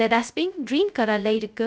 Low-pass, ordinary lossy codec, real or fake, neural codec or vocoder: none; none; fake; codec, 16 kHz, 0.2 kbps, FocalCodec